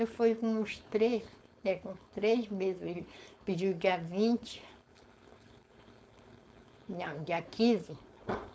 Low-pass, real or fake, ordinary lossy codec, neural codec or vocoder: none; fake; none; codec, 16 kHz, 4.8 kbps, FACodec